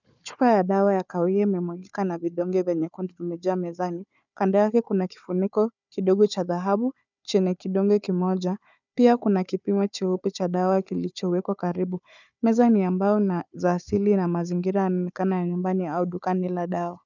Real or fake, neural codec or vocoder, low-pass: fake; codec, 16 kHz, 4 kbps, FunCodec, trained on Chinese and English, 50 frames a second; 7.2 kHz